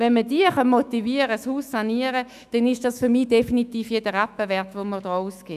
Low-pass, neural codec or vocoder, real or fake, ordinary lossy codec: 14.4 kHz; autoencoder, 48 kHz, 128 numbers a frame, DAC-VAE, trained on Japanese speech; fake; none